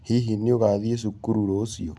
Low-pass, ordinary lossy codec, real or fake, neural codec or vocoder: none; none; real; none